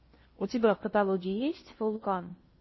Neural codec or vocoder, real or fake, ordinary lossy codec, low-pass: codec, 16 kHz in and 24 kHz out, 0.8 kbps, FocalCodec, streaming, 65536 codes; fake; MP3, 24 kbps; 7.2 kHz